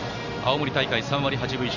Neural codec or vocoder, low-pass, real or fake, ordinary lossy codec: none; 7.2 kHz; real; none